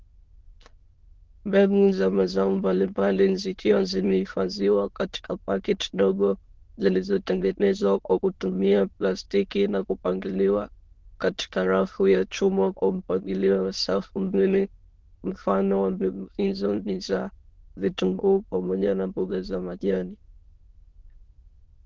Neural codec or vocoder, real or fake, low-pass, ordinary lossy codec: autoencoder, 22.05 kHz, a latent of 192 numbers a frame, VITS, trained on many speakers; fake; 7.2 kHz; Opus, 16 kbps